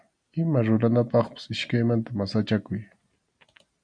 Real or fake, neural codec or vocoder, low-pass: real; none; 9.9 kHz